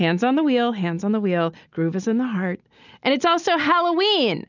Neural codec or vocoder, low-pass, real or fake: none; 7.2 kHz; real